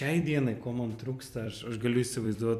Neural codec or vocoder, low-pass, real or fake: vocoder, 44.1 kHz, 128 mel bands every 256 samples, BigVGAN v2; 14.4 kHz; fake